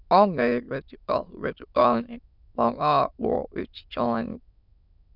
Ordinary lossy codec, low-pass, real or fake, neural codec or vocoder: none; 5.4 kHz; fake; autoencoder, 22.05 kHz, a latent of 192 numbers a frame, VITS, trained on many speakers